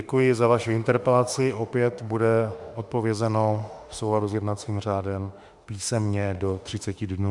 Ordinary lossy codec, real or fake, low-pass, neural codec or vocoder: Opus, 64 kbps; fake; 10.8 kHz; autoencoder, 48 kHz, 32 numbers a frame, DAC-VAE, trained on Japanese speech